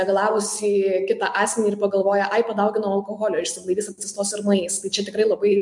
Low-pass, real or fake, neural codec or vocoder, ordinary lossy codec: 10.8 kHz; real; none; MP3, 64 kbps